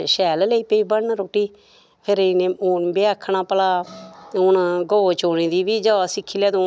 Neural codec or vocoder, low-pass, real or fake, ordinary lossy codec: none; none; real; none